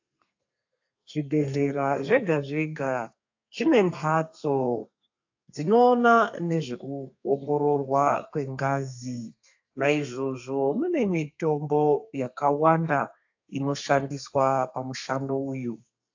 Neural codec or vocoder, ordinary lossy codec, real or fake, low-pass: codec, 32 kHz, 1.9 kbps, SNAC; AAC, 48 kbps; fake; 7.2 kHz